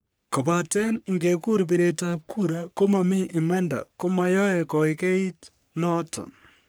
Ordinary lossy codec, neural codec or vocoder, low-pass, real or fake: none; codec, 44.1 kHz, 3.4 kbps, Pupu-Codec; none; fake